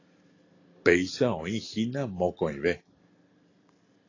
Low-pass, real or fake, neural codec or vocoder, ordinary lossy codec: 7.2 kHz; real; none; AAC, 32 kbps